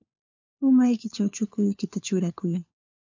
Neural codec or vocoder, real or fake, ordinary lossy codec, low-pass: codec, 16 kHz, 4 kbps, FunCodec, trained on LibriTTS, 50 frames a second; fake; MP3, 64 kbps; 7.2 kHz